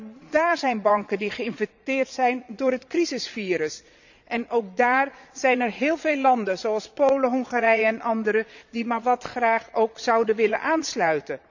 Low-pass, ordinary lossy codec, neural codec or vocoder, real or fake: 7.2 kHz; none; vocoder, 22.05 kHz, 80 mel bands, Vocos; fake